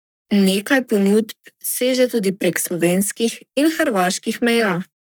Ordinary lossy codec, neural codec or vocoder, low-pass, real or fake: none; codec, 44.1 kHz, 3.4 kbps, Pupu-Codec; none; fake